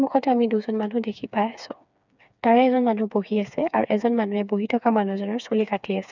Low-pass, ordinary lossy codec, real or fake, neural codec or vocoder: 7.2 kHz; none; fake; codec, 16 kHz, 4 kbps, FreqCodec, smaller model